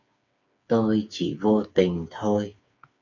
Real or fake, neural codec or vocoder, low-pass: fake; codec, 16 kHz, 4 kbps, FreqCodec, smaller model; 7.2 kHz